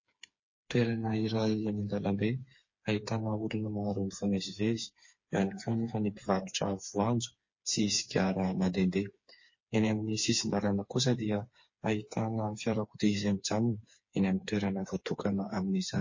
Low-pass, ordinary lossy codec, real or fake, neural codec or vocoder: 7.2 kHz; MP3, 32 kbps; fake; codec, 16 kHz, 4 kbps, FreqCodec, smaller model